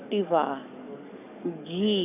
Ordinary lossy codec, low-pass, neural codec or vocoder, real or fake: none; 3.6 kHz; codec, 44.1 kHz, 7.8 kbps, DAC; fake